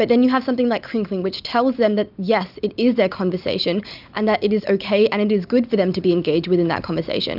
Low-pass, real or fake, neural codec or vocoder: 5.4 kHz; real; none